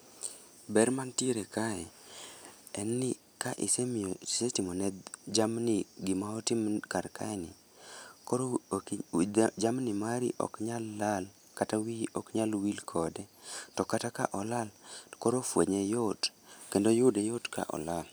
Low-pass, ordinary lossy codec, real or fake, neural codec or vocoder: none; none; real; none